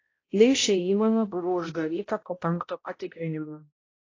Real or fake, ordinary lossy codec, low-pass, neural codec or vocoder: fake; AAC, 32 kbps; 7.2 kHz; codec, 16 kHz, 0.5 kbps, X-Codec, HuBERT features, trained on balanced general audio